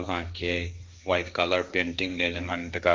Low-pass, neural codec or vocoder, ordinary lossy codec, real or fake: none; codec, 16 kHz, 1.1 kbps, Voila-Tokenizer; none; fake